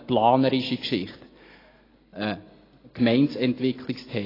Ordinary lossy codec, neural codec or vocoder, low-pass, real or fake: AAC, 24 kbps; none; 5.4 kHz; real